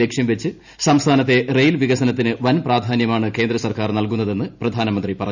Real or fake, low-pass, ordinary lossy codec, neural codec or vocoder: real; 7.2 kHz; none; none